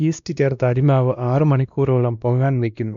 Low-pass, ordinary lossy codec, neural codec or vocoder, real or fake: 7.2 kHz; none; codec, 16 kHz, 1 kbps, X-Codec, WavLM features, trained on Multilingual LibriSpeech; fake